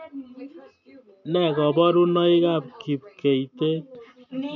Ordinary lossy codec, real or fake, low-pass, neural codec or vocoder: none; real; 7.2 kHz; none